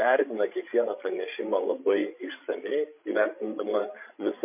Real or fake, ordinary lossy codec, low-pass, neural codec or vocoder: fake; MP3, 24 kbps; 3.6 kHz; codec, 16 kHz, 16 kbps, FreqCodec, larger model